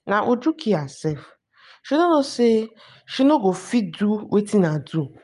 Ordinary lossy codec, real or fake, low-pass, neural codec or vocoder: none; real; 10.8 kHz; none